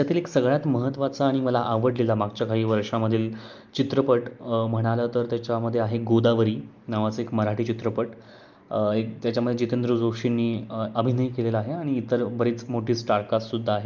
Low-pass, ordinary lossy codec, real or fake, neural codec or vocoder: 7.2 kHz; Opus, 24 kbps; real; none